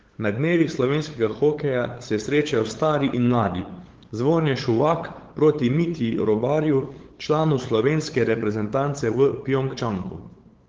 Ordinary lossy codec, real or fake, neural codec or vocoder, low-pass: Opus, 16 kbps; fake; codec, 16 kHz, 8 kbps, FunCodec, trained on LibriTTS, 25 frames a second; 7.2 kHz